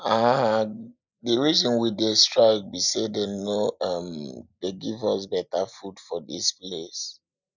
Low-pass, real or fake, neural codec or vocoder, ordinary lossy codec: 7.2 kHz; real; none; none